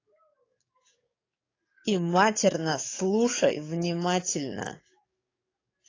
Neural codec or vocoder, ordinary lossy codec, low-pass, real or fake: codec, 44.1 kHz, 7.8 kbps, DAC; AAC, 32 kbps; 7.2 kHz; fake